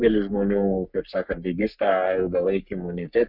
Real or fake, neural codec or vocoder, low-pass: fake; codec, 44.1 kHz, 3.4 kbps, Pupu-Codec; 5.4 kHz